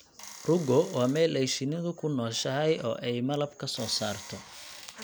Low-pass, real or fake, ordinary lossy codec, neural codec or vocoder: none; real; none; none